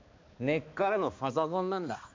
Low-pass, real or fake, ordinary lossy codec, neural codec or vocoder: 7.2 kHz; fake; MP3, 64 kbps; codec, 16 kHz, 2 kbps, X-Codec, HuBERT features, trained on balanced general audio